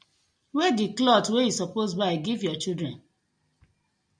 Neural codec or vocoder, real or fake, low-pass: none; real; 9.9 kHz